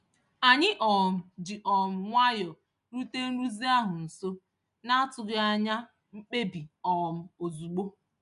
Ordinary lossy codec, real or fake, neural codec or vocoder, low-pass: none; real; none; 10.8 kHz